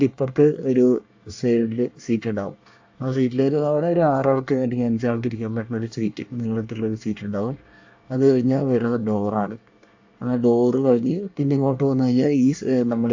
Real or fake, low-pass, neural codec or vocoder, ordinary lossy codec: fake; 7.2 kHz; codec, 24 kHz, 1 kbps, SNAC; AAC, 48 kbps